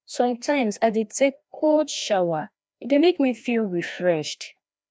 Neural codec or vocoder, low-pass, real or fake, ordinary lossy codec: codec, 16 kHz, 1 kbps, FreqCodec, larger model; none; fake; none